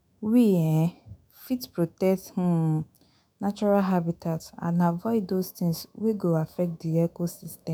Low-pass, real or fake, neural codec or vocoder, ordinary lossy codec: none; fake; autoencoder, 48 kHz, 128 numbers a frame, DAC-VAE, trained on Japanese speech; none